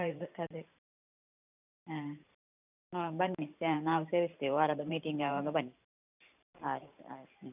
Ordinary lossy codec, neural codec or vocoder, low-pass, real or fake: none; vocoder, 44.1 kHz, 128 mel bands every 512 samples, BigVGAN v2; 3.6 kHz; fake